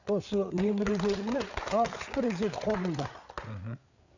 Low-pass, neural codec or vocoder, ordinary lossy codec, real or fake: 7.2 kHz; codec, 16 kHz, 8 kbps, FreqCodec, larger model; none; fake